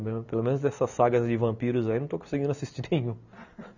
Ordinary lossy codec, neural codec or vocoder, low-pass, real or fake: none; none; 7.2 kHz; real